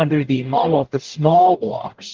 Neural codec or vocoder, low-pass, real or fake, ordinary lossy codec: codec, 44.1 kHz, 0.9 kbps, DAC; 7.2 kHz; fake; Opus, 16 kbps